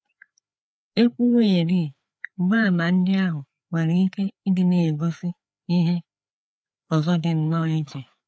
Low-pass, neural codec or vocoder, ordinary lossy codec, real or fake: none; codec, 16 kHz, 4 kbps, FreqCodec, larger model; none; fake